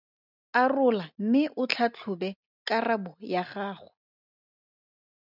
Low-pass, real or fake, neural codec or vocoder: 5.4 kHz; real; none